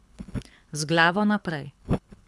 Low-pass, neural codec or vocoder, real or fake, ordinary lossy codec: none; codec, 24 kHz, 6 kbps, HILCodec; fake; none